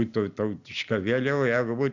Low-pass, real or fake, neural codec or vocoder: 7.2 kHz; real; none